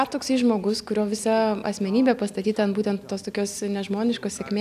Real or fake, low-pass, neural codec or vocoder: real; 14.4 kHz; none